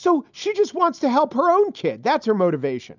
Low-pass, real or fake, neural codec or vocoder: 7.2 kHz; real; none